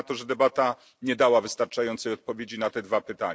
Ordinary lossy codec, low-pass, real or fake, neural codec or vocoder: none; none; real; none